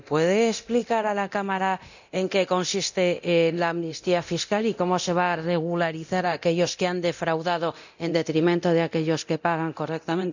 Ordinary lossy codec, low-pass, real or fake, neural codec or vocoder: none; 7.2 kHz; fake; codec, 24 kHz, 0.9 kbps, DualCodec